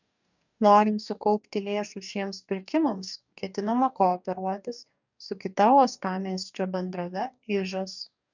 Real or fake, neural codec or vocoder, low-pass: fake; codec, 44.1 kHz, 2.6 kbps, DAC; 7.2 kHz